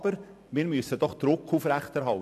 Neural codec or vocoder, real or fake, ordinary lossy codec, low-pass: none; real; AAC, 96 kbps; 14.4 kHz